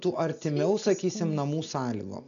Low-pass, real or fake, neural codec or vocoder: 7.2 kHz; real; none